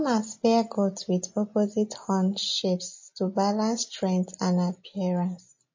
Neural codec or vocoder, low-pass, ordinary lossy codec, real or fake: none; 7.2 kHz; MP3, 32 kbps; real